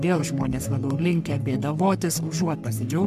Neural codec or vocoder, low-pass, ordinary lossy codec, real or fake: codec, 44.1 kHz, 3.4 kbps, Pupu-Codec; 14.4 kHz; MP3, 96 kbps; fake